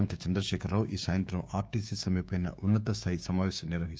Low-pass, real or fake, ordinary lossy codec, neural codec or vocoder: none; fake; none; codec, 16 kHz, 6 kbps, DAC